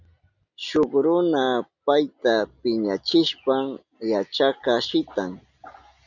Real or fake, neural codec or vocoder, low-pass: real; none; 7.2 kHz